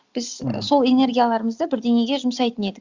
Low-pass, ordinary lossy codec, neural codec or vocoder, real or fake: 7.2 kHz; Opus, 64 kbps; none; real